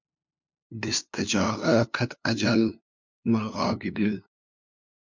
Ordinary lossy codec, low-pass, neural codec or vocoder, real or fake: MP3, 64 kbps; 7.2 kHz; codec, 16 kHz, 2 kbps, FunCodec, trained on LibriTTS, 25 frames a second; fake